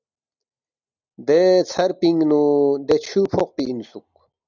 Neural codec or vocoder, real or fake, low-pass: none; real; 7.2 kHz